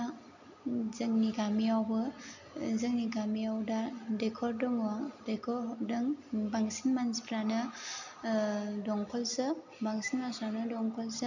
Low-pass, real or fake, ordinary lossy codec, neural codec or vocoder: 7.2 kHz; real; none; none